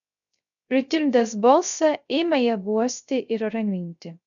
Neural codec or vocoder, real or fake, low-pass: codec, 16 kHz, 0.3 kbps, FocalCodec; fake; 7.2 kHz